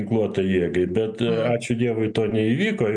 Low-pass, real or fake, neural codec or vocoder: 9.9 kHz; real; none